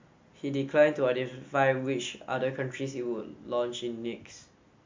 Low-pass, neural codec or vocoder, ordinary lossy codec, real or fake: 7.2 kHz; none; MP3, 48 kbps; real